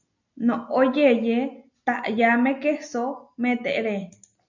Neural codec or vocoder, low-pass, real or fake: none; 7.2 kHz; real